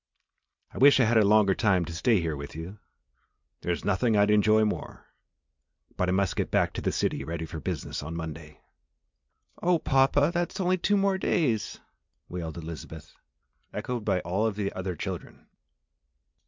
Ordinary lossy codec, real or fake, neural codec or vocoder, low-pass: MP3, 64 kbps; real; none; 7.2 kHz